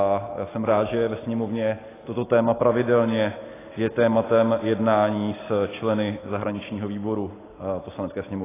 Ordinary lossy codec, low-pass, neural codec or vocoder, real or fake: AAC, 16 kbps; 3.6 kHz; none; real